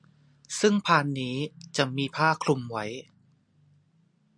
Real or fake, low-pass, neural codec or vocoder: real; 9.9 kHz; none